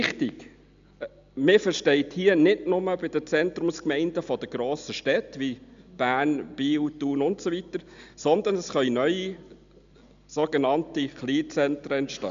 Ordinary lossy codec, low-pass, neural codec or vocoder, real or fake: MP3, 64 kbps; 7.2 kHz; none; real